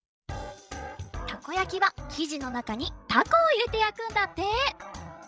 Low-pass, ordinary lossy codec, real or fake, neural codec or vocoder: none; none; fake; codec, 16 kHz, 16 kbps, FreqCodec, larger model